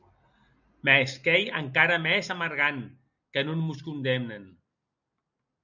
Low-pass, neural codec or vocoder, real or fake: 7.2 kHz; none; real